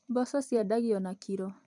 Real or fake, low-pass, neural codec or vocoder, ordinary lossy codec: fake; 10.8 kHz; vocoder, 44.1 kHz, 128 mel bands every 256 samples, BigVGAN v2; MP3, 96 kbps